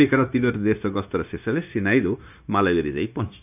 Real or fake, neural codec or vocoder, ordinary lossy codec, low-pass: fake; codec, 16 kHz, 0.9 kbps, LongCat-Audio-Codec; none; 3.6 kHz